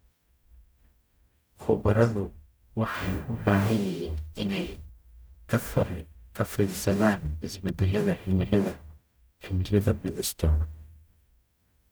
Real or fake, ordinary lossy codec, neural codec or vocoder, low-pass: fake; none; codec, 44.1 kHz, 0.9 kbps, DAC; none